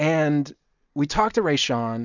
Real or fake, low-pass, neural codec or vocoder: real; 7.2 kHz; none